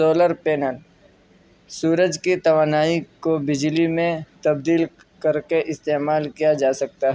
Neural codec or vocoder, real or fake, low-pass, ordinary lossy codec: none; real; none; none